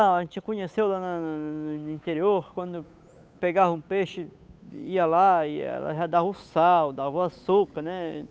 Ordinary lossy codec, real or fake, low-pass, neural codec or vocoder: none; fake; none; codec, 16 kHz, 8 kbps, FunCodec, trained on Chinese and English, 25 frames a second